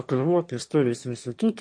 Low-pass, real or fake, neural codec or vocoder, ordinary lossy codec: 9.9 kHz; fake; autoencoder, 22.05 kHz, a latent of 192 numbers a frame, VITS, trained on one speaker; MP3, 48 kbps